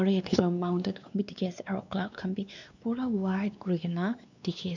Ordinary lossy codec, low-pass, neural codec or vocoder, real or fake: none; 7.2 kHz; codec, 16 kHz, 2 kbps, X-Codec, WavLM features, trained on Multilingual LibriSpeech; fake